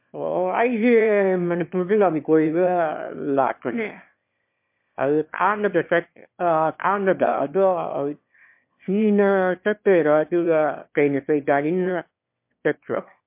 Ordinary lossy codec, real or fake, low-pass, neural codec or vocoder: MP3, 32 kbps; fake; 3.6 kHz; autoencoder, 22.05 kHz, a latent of 192 numbers a frame, VITS, trained on one speaker